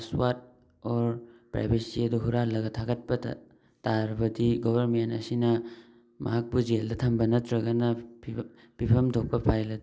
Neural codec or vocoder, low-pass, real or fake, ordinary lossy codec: none; none; real; none